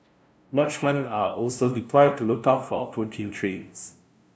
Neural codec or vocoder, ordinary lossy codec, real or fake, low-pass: codec, 16 kHz, 0.5 kbps, FunCodec, trained on LibriTTS, 25 frames a second; none; fake; none